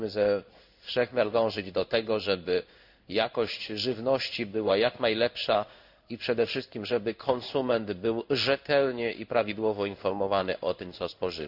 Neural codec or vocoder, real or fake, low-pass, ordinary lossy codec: codec, 16 kHz in and 24 kHz out, 1 kbps, XY-Tokenizer; fake; 5.4 kHz; none